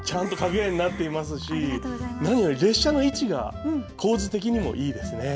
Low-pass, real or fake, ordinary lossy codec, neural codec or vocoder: none; real; none; none